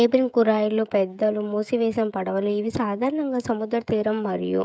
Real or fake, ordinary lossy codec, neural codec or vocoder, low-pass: fake; none; codec, 16 kHz, 16 kbps, FreqCodec, smaller model; none